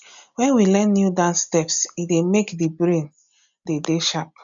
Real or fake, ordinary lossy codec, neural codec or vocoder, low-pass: real; MP3, 96 kbps; none; 7.2 kHz